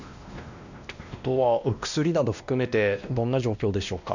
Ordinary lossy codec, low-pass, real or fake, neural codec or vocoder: none; 7.2 kHz; fake; codec, 16 kHz, 1 kbps, X-Codec, WavLM features, trained on Multilingual LibriSpeech